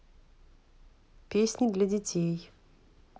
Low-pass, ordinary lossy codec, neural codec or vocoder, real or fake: none; none; none; real